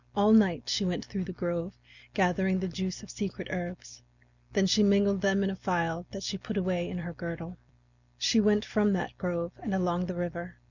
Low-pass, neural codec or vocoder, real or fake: 7.2 kHz; none; real